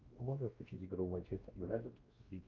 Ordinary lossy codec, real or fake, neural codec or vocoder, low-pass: Opus, 32 kbps; fake; codec, 16 kHz, 1 kbps, X-Codec, WavLM features, trained on Multilingual LibriSpeech; 7.2 kHz